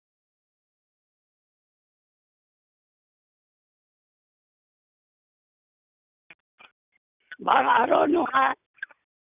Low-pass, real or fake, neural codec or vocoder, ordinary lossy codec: 3.6 kHz; real; none; none